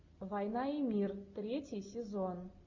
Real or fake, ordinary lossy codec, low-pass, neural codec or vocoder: real; MP3, 48 kbps; 7.2 kHz; none